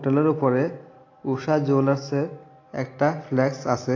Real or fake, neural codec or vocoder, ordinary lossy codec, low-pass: real; none; AAC, 32 kbps; 7.2 kHz